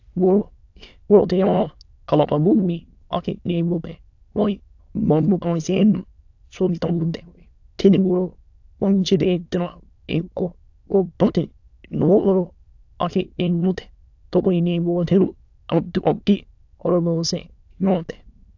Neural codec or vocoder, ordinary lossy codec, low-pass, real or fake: autoencoder, 22.05 kHz, a latent of 192 numbers a frame, VITS, trained on many speakers; AAC, 48 kbps; 7.2 kHz; fake